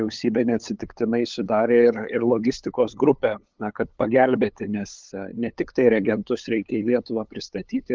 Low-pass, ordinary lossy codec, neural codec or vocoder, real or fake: 7.2 kHz; Opus, 32 kbps; codec, 16 kHz, 8 kbps, FunCodec, trained on LibriTTS, 25 frames a second; fake